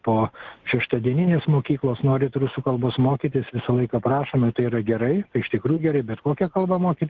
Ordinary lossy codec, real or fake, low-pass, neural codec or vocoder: Opus, 16 kbps; real; 7.2 kHz; none